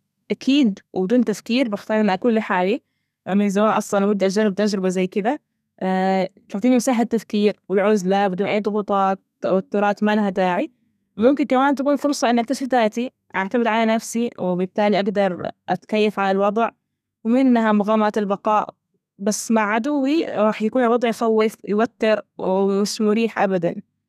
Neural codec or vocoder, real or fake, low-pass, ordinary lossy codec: codec, 32 kHz, 1.9 kbps, SNAC; fake; 14.4 kHz; none